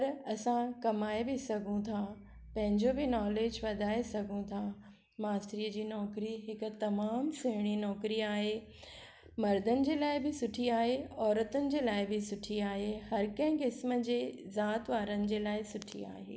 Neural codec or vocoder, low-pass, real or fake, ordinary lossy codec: none; none; real; none